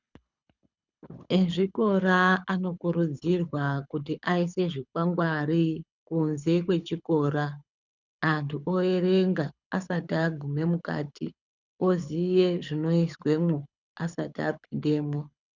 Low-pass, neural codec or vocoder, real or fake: 7.2 kHz; codec, 24 kHz, 6 kbps, HILCodec; fake